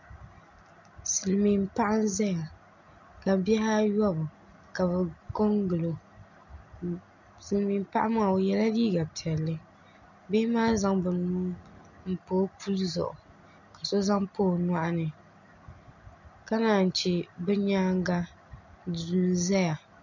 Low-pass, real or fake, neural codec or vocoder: 7.2 kHz; real; none